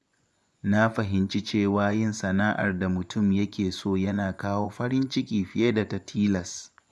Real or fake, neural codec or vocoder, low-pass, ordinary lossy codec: fake; vocoder, 24 kHz, 100 mel bands, Vocos; none; none